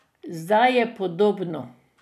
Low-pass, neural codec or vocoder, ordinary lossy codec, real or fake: 14.4 kHz; vocoder, 44.1 kHz, 128 mel bands every 256 samples, BigVGAN v2; none; fake